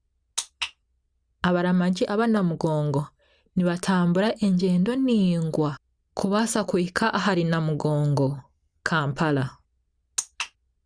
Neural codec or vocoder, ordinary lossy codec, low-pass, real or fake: none; Opus, 64 kbps; 9.9 kHz; real